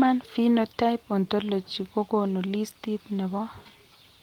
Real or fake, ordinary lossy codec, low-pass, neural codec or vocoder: real; Opus, 24 kbps; 19.8 kHz; none